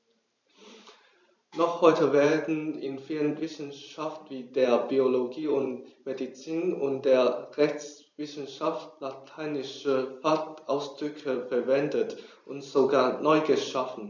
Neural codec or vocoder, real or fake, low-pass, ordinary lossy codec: vocoder, 44.1 kHz, 128 mel bands every 512 samples, BigVGAN v2; fake; 7.2 kHz; none